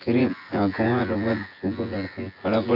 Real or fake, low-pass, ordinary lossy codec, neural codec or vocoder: fake; 5.4 kHz; AAC, 32 kbps; vocoder, 24 kHz, 100 mel bands, Vocos